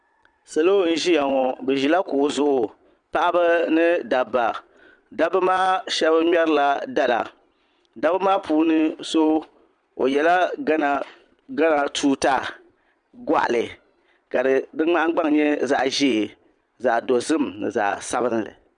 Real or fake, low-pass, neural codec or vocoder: fake; 9.9 kHz; vocoder, 22.05 kHz, 80 mel bands, Vocos